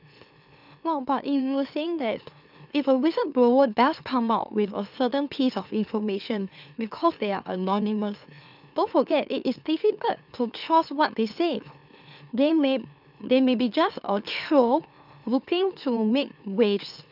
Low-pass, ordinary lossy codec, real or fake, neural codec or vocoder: 5.4 kHz; none; fake; autoencoder, 44.1 kHz, a latent of 192 numbers a frame, MeloTTS